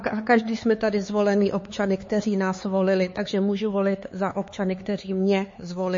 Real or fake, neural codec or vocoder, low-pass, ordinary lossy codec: fake; codec, 16 kHz, 4 kbps, X-Codec, HuBERT features, trained on LibriSpeech; 7.2 kHz; MP3, 32 kbps